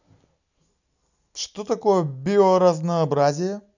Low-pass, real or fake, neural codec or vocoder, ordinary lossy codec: 7.2 kHz; real; none; none